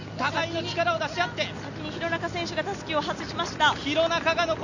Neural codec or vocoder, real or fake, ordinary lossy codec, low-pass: none; real; none; 7.2 kHz